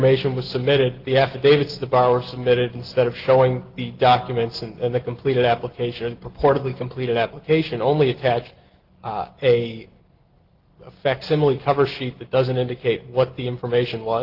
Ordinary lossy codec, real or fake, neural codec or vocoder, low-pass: Opus, 16 kbps; real; none; 5.4 kHz